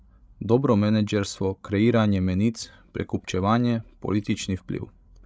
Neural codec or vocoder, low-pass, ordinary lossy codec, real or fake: codec, 16 kHz, 16 kbps, FreqCodec, larger model; none; none; fake